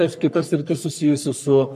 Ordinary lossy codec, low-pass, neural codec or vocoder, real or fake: MP3, 96 kbps; 14.4 kHz; codec, 44.1 kHz, 3.4 kbps, Pupu-Codec; fake